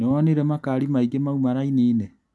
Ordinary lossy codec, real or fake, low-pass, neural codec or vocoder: none; real; none; none